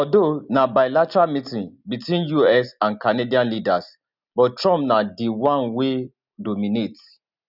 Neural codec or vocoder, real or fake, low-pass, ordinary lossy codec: none; real; 5.4 kHz; none